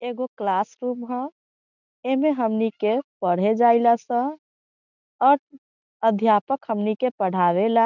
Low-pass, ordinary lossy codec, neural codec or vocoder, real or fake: 7.2 kHz; none; none; real